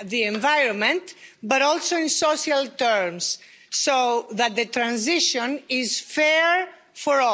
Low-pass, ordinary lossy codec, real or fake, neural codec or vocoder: none; none; real; none